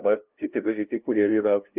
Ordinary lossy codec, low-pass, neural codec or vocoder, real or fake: Opus, 32 kbps; 3.6 kHz; codec, 16 kHz, 0.5 kbps, FunCodec, trained on LibriTTS, 25 frames a second; fake